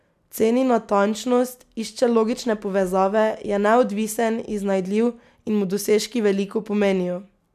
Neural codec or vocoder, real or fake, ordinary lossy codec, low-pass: none; real; none; 14.4 kHz